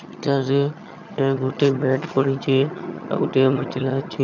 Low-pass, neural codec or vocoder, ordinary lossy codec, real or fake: 7.2 kHz; vocoder, 22.05 kHz, 80 mel bands, HiFi-GAN; none; fake